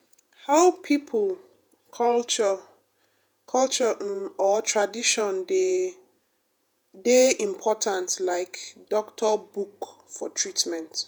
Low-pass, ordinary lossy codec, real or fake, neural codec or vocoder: none; none; fake; vocoder, 48 kHz, 128 mel bands, Vocos